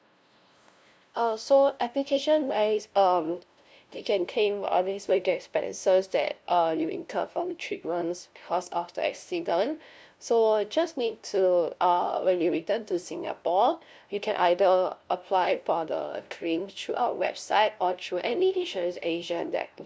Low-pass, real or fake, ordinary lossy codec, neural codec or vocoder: none; fake; none; codec, 16 kHz, 0.5 kbps, FunCodec, trained on LibriTTS, 25 frames a second